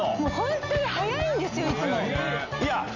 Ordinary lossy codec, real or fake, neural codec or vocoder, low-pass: none; real; none; 7.2 kHz